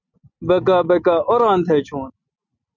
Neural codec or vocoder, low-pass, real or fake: none; 7.2 kHz; real